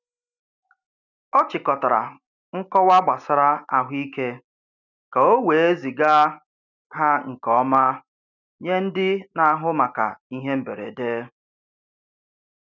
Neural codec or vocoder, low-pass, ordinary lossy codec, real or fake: none; 7.2 kHz; none; real